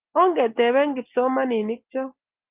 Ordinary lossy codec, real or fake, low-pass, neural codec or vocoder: Opus, 32 kbps; real; 3.6 kHz; none